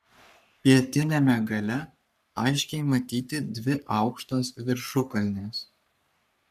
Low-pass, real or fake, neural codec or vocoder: 14.4 kHz; fake; codec, 44.1 kHz, 3.4 kbps, Pupu-Codec